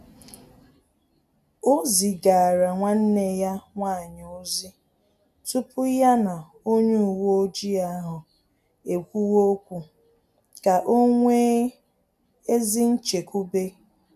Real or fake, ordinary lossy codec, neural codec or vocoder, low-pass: real; none; none; 14.4 kHz